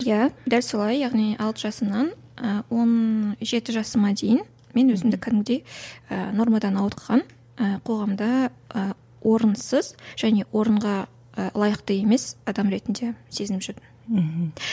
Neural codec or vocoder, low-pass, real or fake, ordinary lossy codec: none; none; real; none